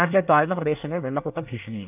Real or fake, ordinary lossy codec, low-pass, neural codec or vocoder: fake; none; 3.6 kHz; codec, 24 kHz, 1 kbps, SNAC